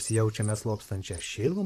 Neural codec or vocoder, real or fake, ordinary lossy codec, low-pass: vocoder, 44.1 kHz, 128 mel bands, Pupu-Vocoder; fake; AAC, 96 kbps; 14.4 kHz